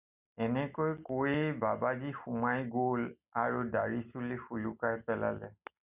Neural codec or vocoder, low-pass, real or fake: none; 3.6 kHz; real